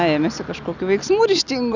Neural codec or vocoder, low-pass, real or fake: none; 7.2 kHz; real